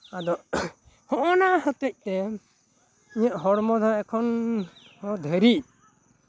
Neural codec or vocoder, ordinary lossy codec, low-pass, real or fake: none; none; none; real